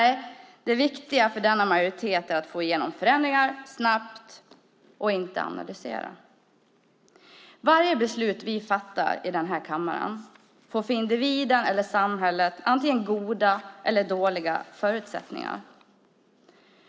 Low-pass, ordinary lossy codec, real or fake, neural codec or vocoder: none; none; real; none